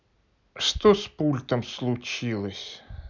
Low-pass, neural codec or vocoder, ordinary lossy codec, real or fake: 7.2 kHz; none; none; real